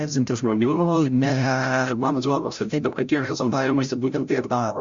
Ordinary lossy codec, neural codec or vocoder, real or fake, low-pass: Opus, 64 kbps; codec, 16 kHz, 0.5 kbps, FreqCodec, larger model; fake; 7.2 kHz